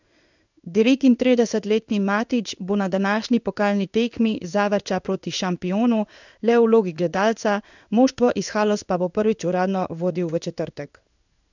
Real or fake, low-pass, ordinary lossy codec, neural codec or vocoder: fake; 7.2 kHz; none; codec, 16 kHz in and 24 kHz out, 1 kbps, XY-Tokenizer